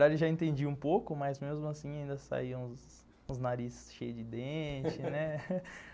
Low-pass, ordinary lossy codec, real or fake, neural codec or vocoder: none; none; real; none